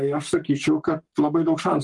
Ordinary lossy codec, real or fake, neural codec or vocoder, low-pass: Opus, 24 kbps; fake; codec, 44.1 kHz, 7.8 kbps, Pupu-Codec; 10.8 kHz